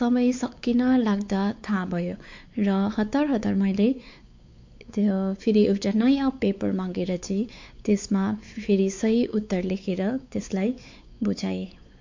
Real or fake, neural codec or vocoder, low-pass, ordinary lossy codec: fake; codec, 16 kHz, 4 kbps, X-Codec, WavLM features, trained on Multilingual LibriSpeech; 7.2 kHz; MP3, 48 kbps